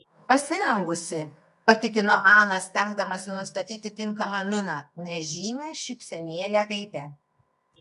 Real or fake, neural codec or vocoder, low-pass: fake; codec, 24 kHz, 0.9 kbps, WavTokenizer, medium music audio release; 10.8 kHz